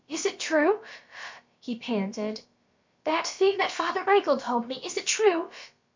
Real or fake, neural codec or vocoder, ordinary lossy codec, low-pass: fake; codec, 16 kHz, about 1 kbps, DyCAST, with the encoder's durations; MP3, 48 kbps; 7.2 kHz